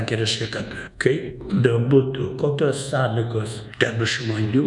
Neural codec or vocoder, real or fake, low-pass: codec, 24 kHz, 1.2 kbps, DualCodec; fake; 10.8 kHz